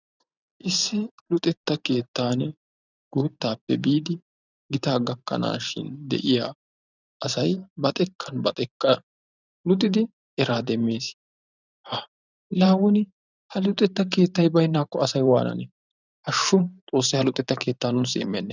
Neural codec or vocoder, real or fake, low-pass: vocoder, 44.1 kHz, 128 mel bands every 512 samples, BigVGAN v2; fake; 7.2 kHz